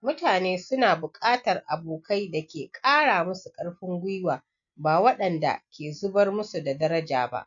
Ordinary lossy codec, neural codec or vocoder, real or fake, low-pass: none; none; real; 7.2 kHz